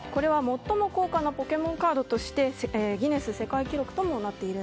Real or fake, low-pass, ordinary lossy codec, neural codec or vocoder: real; none; none; none